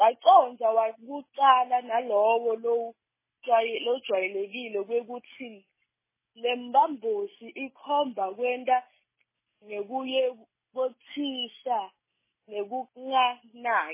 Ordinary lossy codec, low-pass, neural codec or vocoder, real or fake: MP3, 16 kbps; 3.6 kHz; none; real